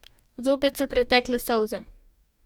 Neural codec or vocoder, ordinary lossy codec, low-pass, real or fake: codec, 44.1 kHz, 2.6 kbps, DAC; none; 19.8 kHz; fake